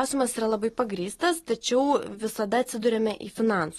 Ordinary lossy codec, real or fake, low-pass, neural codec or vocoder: AAC, 32 kbps; real; 19.8 kHz; none